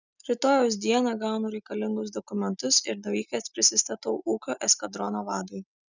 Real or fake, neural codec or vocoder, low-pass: real; none; 7.2 kHz